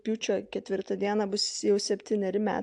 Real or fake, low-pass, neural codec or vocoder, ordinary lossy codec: real; 10.8 kHz; none; AAC, 64 kbps